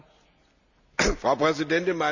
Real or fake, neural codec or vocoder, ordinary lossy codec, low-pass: real; none; none; 7.2 kHz